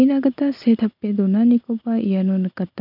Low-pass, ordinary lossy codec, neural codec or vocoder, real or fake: 5.4 kHz; none; none; real